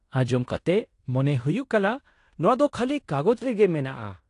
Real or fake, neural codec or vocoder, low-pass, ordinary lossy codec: fake; codec, 16 kHz in and 24 kHz out, 0.9 kbps, LongCat-Audio-Codec, fine tuned four codebook decoder; 10.8 kHz; AAC, 48 kbps